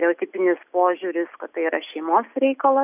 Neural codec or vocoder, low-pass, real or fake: none; 3.6 kHz; real